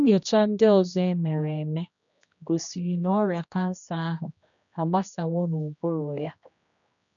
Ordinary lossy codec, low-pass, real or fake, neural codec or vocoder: none; 7.2 kHz; fake; codec, 16 kHz, 1 kbps, X-Codec, HuBERT features, trained on general audio